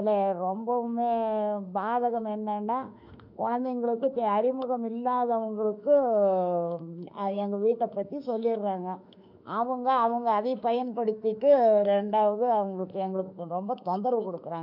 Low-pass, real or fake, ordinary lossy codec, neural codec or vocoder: 5.4 kHz; fake; none; autoencoder, 48 kHz, 32 numbers a frame, DAC-VAE, trained on Japanese speech